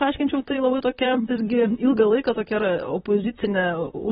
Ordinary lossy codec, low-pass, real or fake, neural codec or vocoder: AAC, 16 kbps; 19.8 kHz; fake; vocoder, 44.1 kHz, 128 mel bands, Pupu-Vocoder